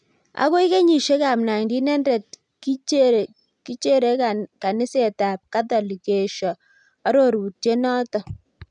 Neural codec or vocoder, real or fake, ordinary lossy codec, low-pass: none; real; none; 9.9 kHz